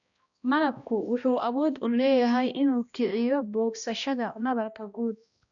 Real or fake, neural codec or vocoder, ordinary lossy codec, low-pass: fake; codec, 16 kHz, 1 kbps, X-Codec, HuBERT features, trained on balanced general audio; none; 7.2 kHz